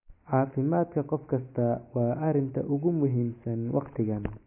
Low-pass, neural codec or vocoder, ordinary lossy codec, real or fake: 3.6 kHz; none; MP3, 24 kbps; real